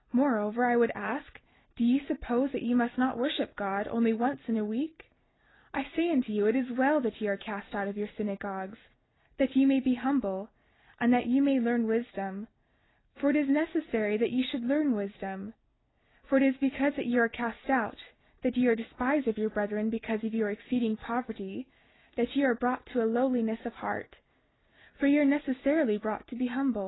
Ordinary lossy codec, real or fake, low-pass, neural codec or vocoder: AAC, 16 kbps; real; 7.2 kHz; none